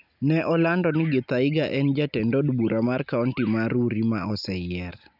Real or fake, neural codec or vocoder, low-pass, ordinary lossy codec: real; none; 5.4 kHz; none